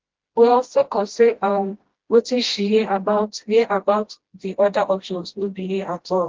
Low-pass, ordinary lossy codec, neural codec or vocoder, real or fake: 7.2 kHz; Opus, 16 kbps; codec, 16 kHz, 1 kbps, FreqCodec, smaller model; fake